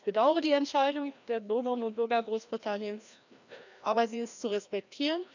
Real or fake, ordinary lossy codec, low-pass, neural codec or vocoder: fake; none; 7.2 kHz; codec, 16 kHz, 1 kbps, FreqCodec, larger model